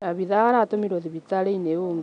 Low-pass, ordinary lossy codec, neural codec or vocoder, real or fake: 9.9 kHz; none; none; real